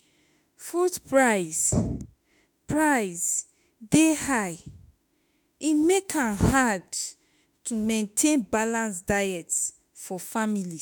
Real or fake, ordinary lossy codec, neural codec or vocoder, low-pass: fake; none; autoencoder, 48 kHz, 32 numbers a frame, DAC-VAE, trained on Japanese speech; none